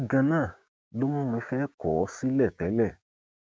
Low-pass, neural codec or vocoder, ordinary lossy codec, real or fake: none; codec, 16 kHz, 6 kbps, DAC; none; fake